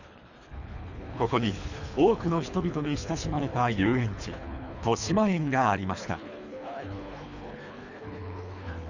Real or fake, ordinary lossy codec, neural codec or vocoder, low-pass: fake; none; codec, 24 kHz, 3 kbps, HILCodec; 7.2 kHz